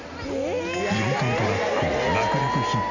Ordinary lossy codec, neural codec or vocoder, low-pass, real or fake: none; none; 7.2 kHz; real